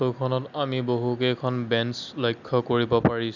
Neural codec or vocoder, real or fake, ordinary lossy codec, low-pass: none; real; none; 7.2 kHz